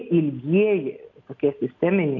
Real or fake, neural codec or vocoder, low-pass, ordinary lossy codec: real; none; 7.2 kHz; AAC, 48 kbps